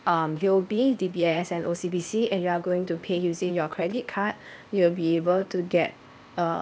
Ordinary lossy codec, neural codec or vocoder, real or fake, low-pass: none; codec, 16 kHz, 0.8 kbps, ZipCodec; fake; none